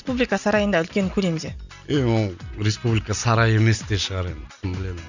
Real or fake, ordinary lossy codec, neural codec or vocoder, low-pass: real; none; none; 7.2 kHz